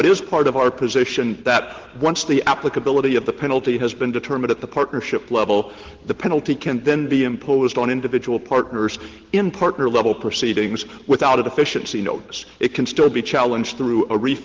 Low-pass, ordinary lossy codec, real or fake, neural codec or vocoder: 7.2 kHz; Opus, 16 kbps; real; none